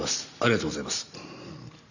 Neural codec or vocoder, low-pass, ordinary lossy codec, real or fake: none; 7.2 kHz; none; real